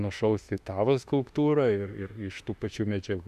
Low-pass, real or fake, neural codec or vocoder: 14.4 kHz; fake; autoencoder, 48 kHz, 32 numbers a frame, DAC-VAE, trained on Japanese speech